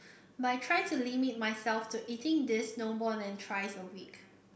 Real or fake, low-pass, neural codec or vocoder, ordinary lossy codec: real; none; none; none